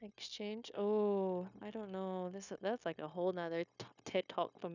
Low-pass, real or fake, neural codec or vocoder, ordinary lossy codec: 7.2 kHz; fake; codec, 16 kHz, 0.9 kbps, LongCat-Audio-Codec; none